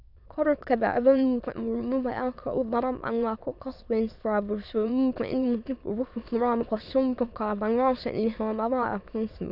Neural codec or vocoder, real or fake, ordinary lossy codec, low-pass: autoencoder, 22.05 kHz, a latent of 192 numbers a frame, VITS, trained on many speakers; fake; none; 5.4 kHz